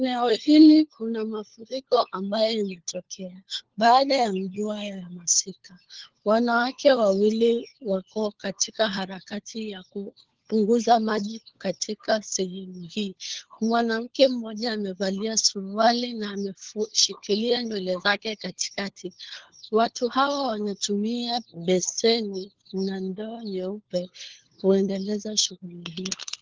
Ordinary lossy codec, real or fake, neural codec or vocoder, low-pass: Opus, 16 kbps; fake; codec, 16 kHz, 4 kbps, FunCodec, trained on LibriTTS, 50 frames a second; 7.2 kHz